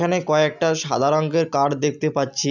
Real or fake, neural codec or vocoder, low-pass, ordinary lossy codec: real; none; 7.2 kHz; none